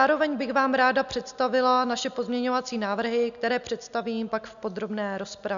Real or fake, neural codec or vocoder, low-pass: real; none; 7.2 kHz